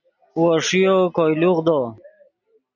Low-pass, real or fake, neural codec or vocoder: 7.2 kHz; real; none